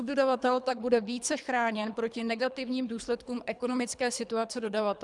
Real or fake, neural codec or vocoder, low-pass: fake; codec, 24 kHz, 3 kbps, HILCodec; 10.8 kHz